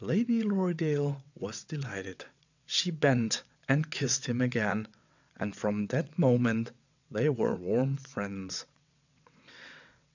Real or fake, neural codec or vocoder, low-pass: fake; vocoder, 22.05 kHz, 80 mel bands, WaveNeXt; 7.2 kHz